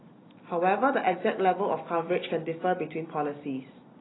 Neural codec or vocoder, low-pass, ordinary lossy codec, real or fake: none; 7.2 kHz; AAC, 16 kbps; real